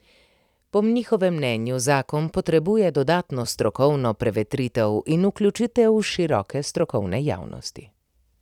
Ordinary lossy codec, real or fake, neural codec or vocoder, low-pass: none; fake; vocoder, 44.1 kHz, 128 mel bands every 256 samples, BigVGAN v2; 19.8 kHz